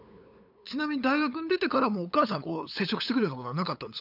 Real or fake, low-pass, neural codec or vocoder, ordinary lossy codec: fake; 5.4 kHz; codec, 16 kHz, 8 kbps, FunCodec, trained on LibriTTS, 25 frames a second; none